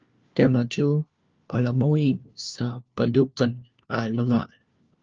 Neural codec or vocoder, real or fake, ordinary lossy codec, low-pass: codec, 16 kHz, 1 kbps, FunCodec, trained on LibriTTS, 50 frames a second; fake; Opus, 24 kbps; 7.2 kHz